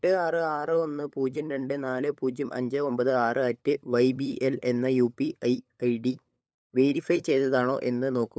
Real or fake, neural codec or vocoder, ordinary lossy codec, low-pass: fake; codec, 16 kHz, 8 kbps, FunCodec, trained on LibriTTS, 25 frames a second; none; none